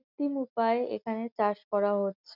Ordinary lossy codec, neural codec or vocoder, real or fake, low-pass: none; none; real; 5.4 kHz